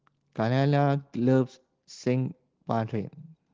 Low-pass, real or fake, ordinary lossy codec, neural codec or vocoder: 7.2 kHz; real; Opus, 16 kbps; none